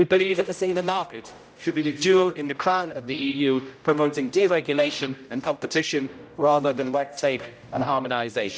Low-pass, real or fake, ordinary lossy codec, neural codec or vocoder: none; fake; none; codec, 16 kHz, 0.5 kbps, X-Codec, HuBERT features, trained on general audio